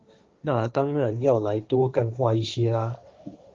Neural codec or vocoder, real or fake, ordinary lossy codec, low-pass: codec, 16 kHz, 1.1 kbps, Voila-Tokenizer; fake; Opus, 24 kbps; 7.2 kHz